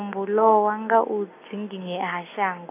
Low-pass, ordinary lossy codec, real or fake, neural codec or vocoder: 3.6 kHz; AAC, 24 kbps; real; none